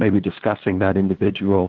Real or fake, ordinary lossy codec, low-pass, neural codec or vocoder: fake; Opus, 16 kbps; 7.2 kHz; codec, 16 kHz, 2 kbps, FunCodec, trained on LibriTTS, 25 frames a second